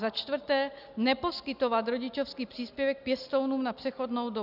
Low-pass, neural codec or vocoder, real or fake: 5.4 kHz; none; real